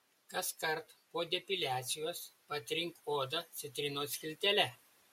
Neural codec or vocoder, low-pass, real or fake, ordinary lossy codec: none; 19.8 kHz; real; MP3, 64 kbps